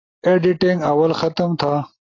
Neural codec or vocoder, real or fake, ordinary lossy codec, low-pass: none; real; AAC, 32 kbps; 7.2 kHz